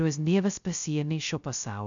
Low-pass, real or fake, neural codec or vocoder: 7.2 kHz; fake; codec, 16 kHz, 0.2 kbps, FocalCodec